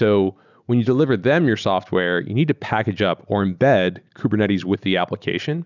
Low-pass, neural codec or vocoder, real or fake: 7.2 kHz; none; real